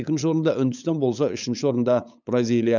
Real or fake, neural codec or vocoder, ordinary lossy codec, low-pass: fake; codec, 16 kHz, 8 kbps, FunCodec, trained on LibriTTS, 25 frames a second; none; 7.2 kHz